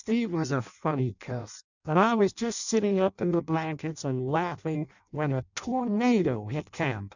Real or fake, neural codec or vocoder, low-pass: fake; codec, 16 kHz in and 24 kHz out, 0.6 kbps, FireRedTTS-2 codec; 7.2 kHz